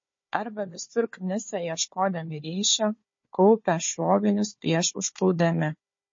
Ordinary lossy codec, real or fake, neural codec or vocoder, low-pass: MP3, 32 kbps; fake; codec, 16 kHz, 4 kbps, FunCodec, trained on Chinese and English, 50 frames a second; 7.2 kHz